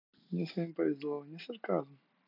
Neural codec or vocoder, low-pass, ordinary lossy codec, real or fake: none; 5.4 kHz; none; real